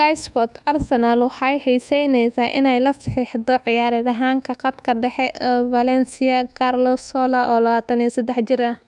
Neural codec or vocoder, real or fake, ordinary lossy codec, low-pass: codec, 24 kHz, 1.2 kbps, DualCodec; fake; none; 10.8 kHz